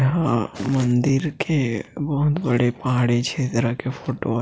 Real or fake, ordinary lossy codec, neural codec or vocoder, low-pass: real; none; none; none